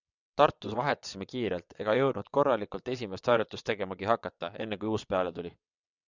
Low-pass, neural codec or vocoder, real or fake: 7.2 kHz; vocoder, 22.05 kHz, 80 mel bands, WaveNeXt; fake